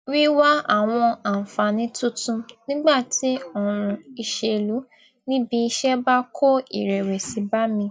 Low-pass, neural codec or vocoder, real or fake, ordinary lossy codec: none; none; real; none